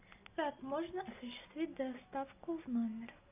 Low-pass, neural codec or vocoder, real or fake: 3.6 kHz; none; real